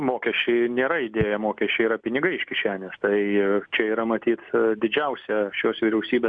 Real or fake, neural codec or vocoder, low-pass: real; none; 9.9 kHz